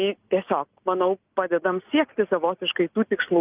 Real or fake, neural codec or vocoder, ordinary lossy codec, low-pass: real; none; Opus, 24 kbps; 3.6 kHz